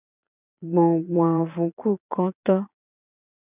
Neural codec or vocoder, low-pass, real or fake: vocoder, 22.05 kHz, 80 mel bands, Vocos; 3.6 kHz; fake